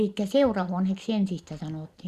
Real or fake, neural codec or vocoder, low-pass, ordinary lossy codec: real; none; 14.4 kHz; Opus, 64 kbps